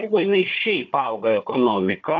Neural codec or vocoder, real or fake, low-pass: codec, 16 kHz, 4 kbps, FunCodec, trained on Chinese and English, 50 frames a second; fake; 7.2 kHz